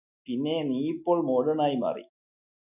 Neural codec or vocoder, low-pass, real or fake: none; 3.6 kHz; real